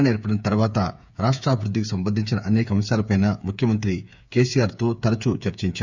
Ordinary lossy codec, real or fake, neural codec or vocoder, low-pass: none; fake; codec, 16 kHz, 8 kbps, FreqCodec, smaller model; 7.2 kHz